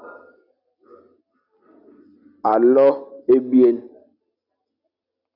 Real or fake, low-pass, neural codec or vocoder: real; 5.4 kHz; none